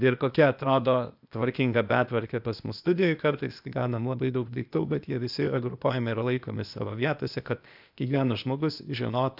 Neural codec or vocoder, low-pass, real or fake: codec, 16 kHz, 0.8 kbps, ZipCodec; 5.4 kHz; fake